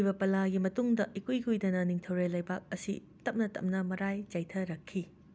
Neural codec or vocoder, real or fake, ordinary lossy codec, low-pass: none; real; none; none